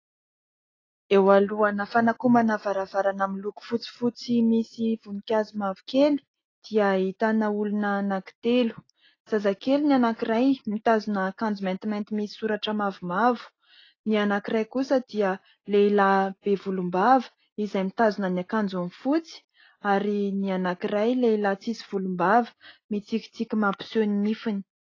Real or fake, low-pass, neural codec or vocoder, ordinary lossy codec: real; 7.2 kHz; none; AAC, 32 kbps